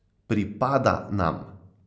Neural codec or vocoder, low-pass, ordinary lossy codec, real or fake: none; none; none; real